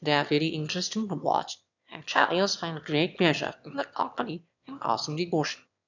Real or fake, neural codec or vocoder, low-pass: fake; autoencoder, 22.05 kHz, a latent of 192 numbers a frame, VITS, trained on one speaker; 7.2 kHz